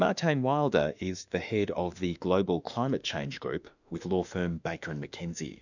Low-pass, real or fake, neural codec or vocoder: 7.2 kHz; fake; autoencoder, 48 kHz, 32 numbers a frame, DAC-VAE, trained on Japanese speech